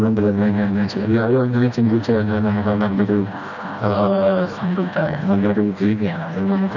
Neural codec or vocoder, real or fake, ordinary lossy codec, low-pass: codec, 16 kHz, 1 kbps, FreqCodec, smaller model; fake; none; 7.2 kHz